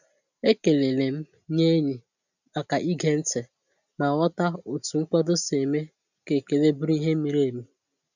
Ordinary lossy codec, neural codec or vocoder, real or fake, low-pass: none; none; real; 7.2 kHz